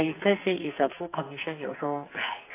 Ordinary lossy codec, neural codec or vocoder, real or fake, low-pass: AAC, 24 kbps; codec, 44.1 kHz, 2.6 kbps, SNAC; fake; 3.6 kHz